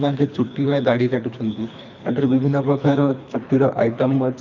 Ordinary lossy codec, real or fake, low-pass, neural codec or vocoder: none; fake; 7.2 kHz; codec, 24 kHz, 3 kbps, HILCodec